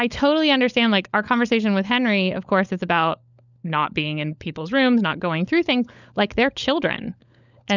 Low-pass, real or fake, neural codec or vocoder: 7.2 kHz; fake; codec, 16 kHz, 16 kbps, FunCodec, trained on LibriTTS, 50 frames a second